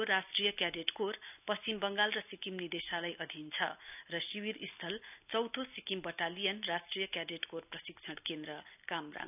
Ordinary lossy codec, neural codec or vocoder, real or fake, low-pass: none; none; real; 3.6 kHz